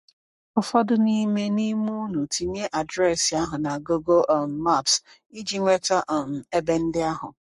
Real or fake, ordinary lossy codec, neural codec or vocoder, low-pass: fake; MP3, 48 kbps; codec, 44.1 kHz, 7.8 kbps, Pupu-Codec; 14.4 kHz